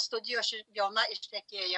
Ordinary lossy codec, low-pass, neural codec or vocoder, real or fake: MP3, 64 kbps; 10.8 kHz; none; real